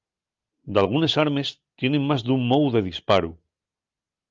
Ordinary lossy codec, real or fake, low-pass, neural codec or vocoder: Opus, 32 kbps; real; 7.2 kHz; none